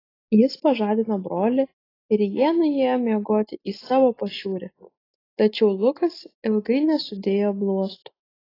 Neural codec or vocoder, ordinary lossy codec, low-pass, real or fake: none; AAC, 24 kbps; 5.4 kHz; real